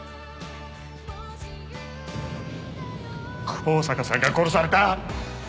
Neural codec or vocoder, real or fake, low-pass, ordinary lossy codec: none; real; none; none